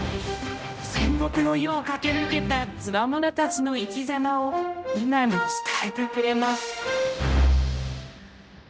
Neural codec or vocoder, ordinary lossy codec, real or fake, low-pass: codec, 16 kHz, 0.5 kbps, X-Codec, HuBERT features, trained on general audio; none; fake; none